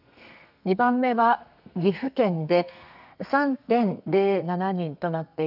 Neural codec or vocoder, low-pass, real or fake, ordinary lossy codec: codec, 32 kHz, 1.9 kbps, SNAC; 5.4 kHz; fake; none